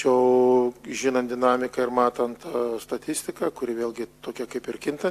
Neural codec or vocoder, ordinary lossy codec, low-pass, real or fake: none; AAC, 64 kbps; 14.4 kHz; real